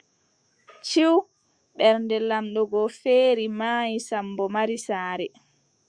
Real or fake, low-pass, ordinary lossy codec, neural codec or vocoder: fake; 9.9 kHz; Opus, 64 kbps; autoencoder, 48 kHz, 128 numbers a frame, DAC-VAE, trained on Japanese speech